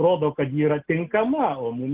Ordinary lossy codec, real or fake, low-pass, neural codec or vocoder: Opus, 16 kbps; real; 3.6 kHz; none